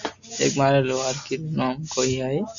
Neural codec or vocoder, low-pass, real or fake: none; 7.2 kHz; real